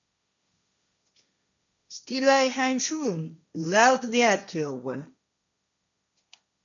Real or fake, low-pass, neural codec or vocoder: fake; 7.2 kHz; codec, 16 kHz, 1.1 kbps, Voila-Tokenizer